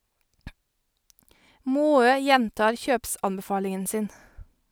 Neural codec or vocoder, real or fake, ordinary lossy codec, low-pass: none; real; none; none